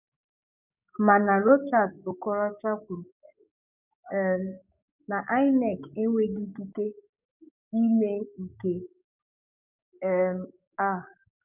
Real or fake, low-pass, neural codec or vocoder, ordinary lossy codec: fake; 3.6 kHz; codec, 44.1 kHz, 7.8 kbps, DAC; none